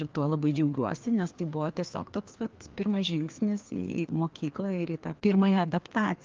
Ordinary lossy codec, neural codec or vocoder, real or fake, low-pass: Opus, 24 kbps; codec, 16 kHz, 2 kbps, FreqCodec, larger model; fake; 7.2 kHz